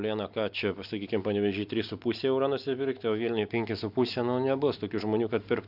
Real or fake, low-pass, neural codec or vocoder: real; 5.4 kHz; none